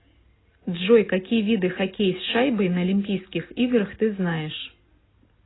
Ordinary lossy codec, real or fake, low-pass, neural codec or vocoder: AAC, 16 kbps; real; 7.2 kHz; none